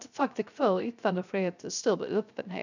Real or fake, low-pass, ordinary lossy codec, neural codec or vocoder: fake; 7.2 kHz; none; codec, 16 kHz, 0.3 kbps, FocalCodec